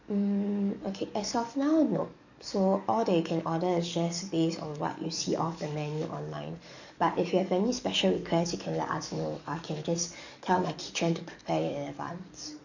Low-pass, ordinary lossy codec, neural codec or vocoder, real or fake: 7.2 kHz; none; codec, 44.1 kHz, 7.8 kbps, DAC; fake